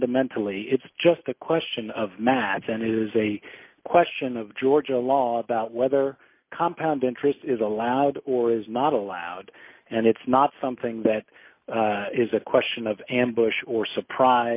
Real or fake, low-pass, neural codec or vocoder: real; 3.6 kHz; none